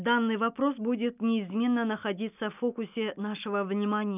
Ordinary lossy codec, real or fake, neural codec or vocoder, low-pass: none; real; none; 3.6 kHz